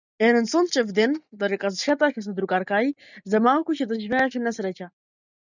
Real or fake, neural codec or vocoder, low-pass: real; none; 7.2 kHz